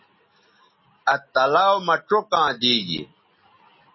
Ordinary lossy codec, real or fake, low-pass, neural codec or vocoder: MP3, 24 kbps; real; 7.2 kHz; none